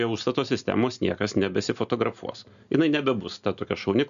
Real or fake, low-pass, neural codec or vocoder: real; 7.2 kHz; none